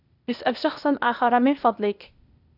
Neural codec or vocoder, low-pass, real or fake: codec, 16 kHz, 0.8 kbps, ZipCodec; 5.4 kHz; fake